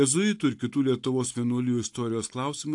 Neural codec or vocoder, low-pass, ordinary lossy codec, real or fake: none; 10.8 kHz; AAC, 64 kbps; real